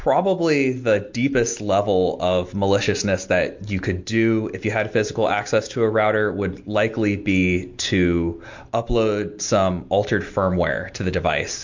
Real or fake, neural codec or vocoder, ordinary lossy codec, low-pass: real; none; MP3, 48 kbps; 7.2 kHz